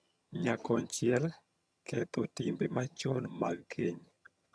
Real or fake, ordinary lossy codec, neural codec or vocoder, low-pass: fake; none; vocoder, 22.05 kHz, 80 mel bands, HiFi-GAN; none